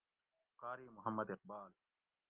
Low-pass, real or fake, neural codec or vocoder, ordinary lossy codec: 3.6 kHz; real; none; Opus, 24 kbps